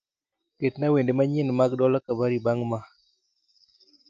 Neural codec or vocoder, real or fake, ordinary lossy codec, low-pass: none; real; Opus, 24 kbps; 7.2 kHz